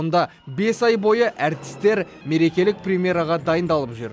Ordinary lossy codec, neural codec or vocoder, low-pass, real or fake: none; none; none; real